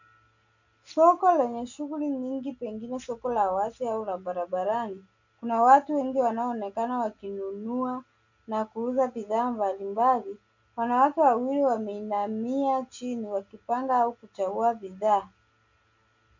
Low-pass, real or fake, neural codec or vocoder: 7.2 kHz; real; none